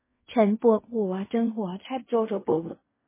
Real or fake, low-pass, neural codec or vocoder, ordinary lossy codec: fake; 3.6 kHz; codec, 16 kHz in and 24 kHz out, 0.4 kbps, LongCat-Audio-Codec, fine tuned four codebook decoder; MP3, 16 kbps